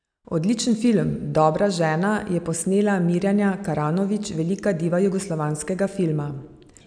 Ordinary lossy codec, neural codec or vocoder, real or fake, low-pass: none; none; real; 9.9 kHz